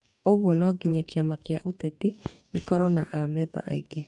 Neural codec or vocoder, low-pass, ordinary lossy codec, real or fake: codec, 44.1 kHz, 2.6 kbps, DAC; 10.8 kHz; none; fake